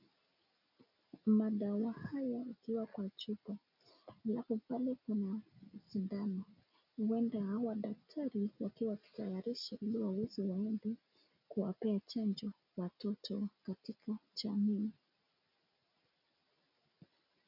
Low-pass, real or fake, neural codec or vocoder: 5.4 kHz; real; none